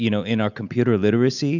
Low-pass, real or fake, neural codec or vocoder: 7.2 kHz; real; none